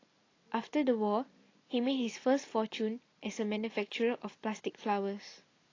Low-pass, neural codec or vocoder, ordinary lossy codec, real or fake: 7.2 kHz; none; AAC, 32 kbps; real